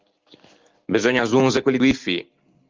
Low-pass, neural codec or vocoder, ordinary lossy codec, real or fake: 7.2 kHz; none; Opus, 32 kbps; real